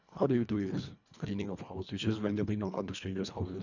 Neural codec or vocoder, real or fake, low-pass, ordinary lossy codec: codec, 24 kHz, 1.5 kbps, HILCodec; fake; 7.2 kHz; none